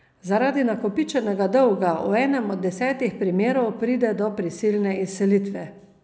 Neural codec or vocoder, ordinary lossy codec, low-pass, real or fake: none; none; none; real